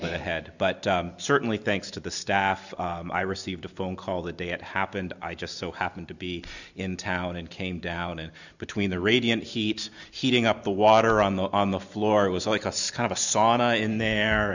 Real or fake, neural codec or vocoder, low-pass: real; none; 7.2 kHz